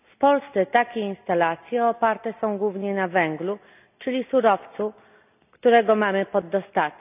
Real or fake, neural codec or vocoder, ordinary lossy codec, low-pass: real; none; none; 3.6 kHz